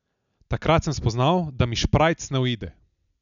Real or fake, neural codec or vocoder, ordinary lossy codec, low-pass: real; none; none; 7.2 kHz